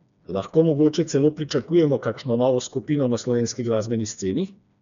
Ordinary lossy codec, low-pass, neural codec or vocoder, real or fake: none; 7.2 kHz; codec, 16 kHz, 2 kbps, FreqCodec, smaller model; fake